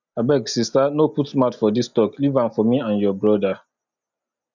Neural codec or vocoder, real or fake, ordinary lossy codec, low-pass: none; real; none; 7.2 kHz